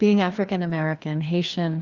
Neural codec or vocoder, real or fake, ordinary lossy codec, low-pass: codec, 16 kHz, 2 kbps, FreqCodec, larger model; fake; Opus, 32 kbps; 7.2 kHz